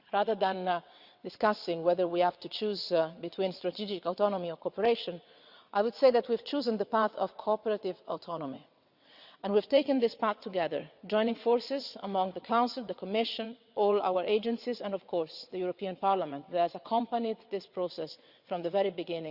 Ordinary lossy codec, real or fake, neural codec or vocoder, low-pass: Opus, 64 kbps; fake; vocoder, 22.05 kHz, 80 mel bands, WaveNeXt; 5.4 kHz